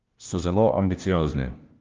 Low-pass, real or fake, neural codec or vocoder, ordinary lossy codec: 7.2 kHz; fake; codec, 16 kHz, 1 kbps, FunCodec, trained on LibriTTS, 50 frames a second; Opus, 32 kbps